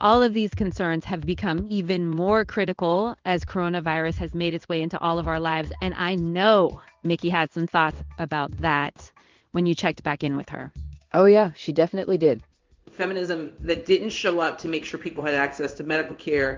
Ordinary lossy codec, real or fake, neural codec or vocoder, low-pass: Opus, 24 kbps; fake; codec, 16 kHz in and 24 kHz out, 1 kbps, XY-Tokenizer; 7.2 kHz